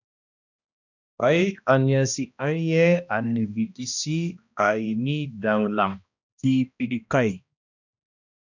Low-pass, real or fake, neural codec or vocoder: 7.2 kHz; fake; codec, 16 kHz, 1 kbps, X-Codec, HuBERT features, trained on balanced general audio